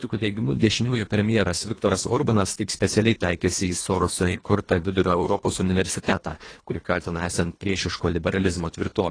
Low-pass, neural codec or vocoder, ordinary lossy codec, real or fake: 9.9 kHz; codec, 24 kHz, 1.5 kbps, HILCodec; AAC, 32 kbps; fake